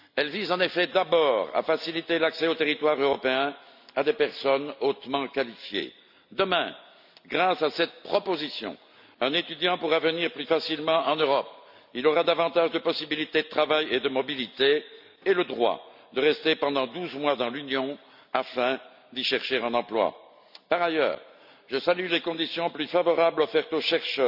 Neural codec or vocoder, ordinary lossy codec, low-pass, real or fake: none; none; 5.4 kHz; real